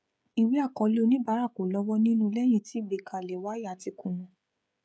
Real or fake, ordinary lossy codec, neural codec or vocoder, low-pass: fake; none; codec, 16 kHz, 16 kbps, FreqCodec, smaller model; none